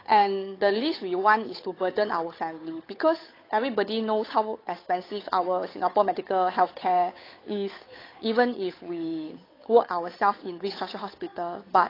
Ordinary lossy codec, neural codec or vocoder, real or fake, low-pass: AAC, 24 kbps; codec, 16 kHz, 8 kbps, FunCodec, trained on Chinese and English, 25 frames a second; fake; 5.4 kHz